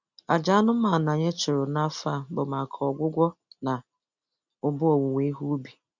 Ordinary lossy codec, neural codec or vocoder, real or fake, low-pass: none; none; real; 7.2 kHz